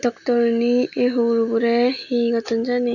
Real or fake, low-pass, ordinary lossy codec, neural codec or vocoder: real; 7.2 kHz; none; none